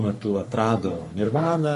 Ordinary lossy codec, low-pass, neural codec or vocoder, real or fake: MP3, 48 kbps; 14.4 kHz; codec, 44.1 kHz, 3.4 kbps, Pupu-Codec; fake